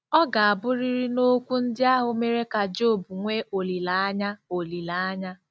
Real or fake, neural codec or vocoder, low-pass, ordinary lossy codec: real; none; none; none